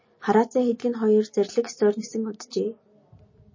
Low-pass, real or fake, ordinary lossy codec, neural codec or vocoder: 7.2 kHz; real; MP3, 32 kbps; none